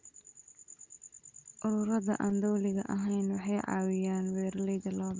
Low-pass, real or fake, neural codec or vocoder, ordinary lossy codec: 7.2 kHz; real; none; Opus, 32 kbps